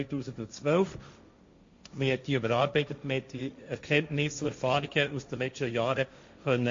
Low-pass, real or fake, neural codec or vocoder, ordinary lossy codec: 7.2 kHz; fake; codec, 16 kHz, 1.1 kbps, Voila-Tokenizer; MP3, 48 kbps